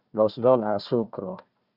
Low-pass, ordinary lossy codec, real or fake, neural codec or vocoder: 5.4 kHz; Opus, 64 kbps; fake; codec, 16 kHz, 1 kbps, FunCodec, trained on Chinese and English, 50 frames a second